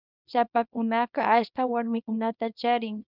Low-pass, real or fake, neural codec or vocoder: 5.4 kHz; fake; codec, 24 kHz, 0.9 kbps, WavTokenizer, small release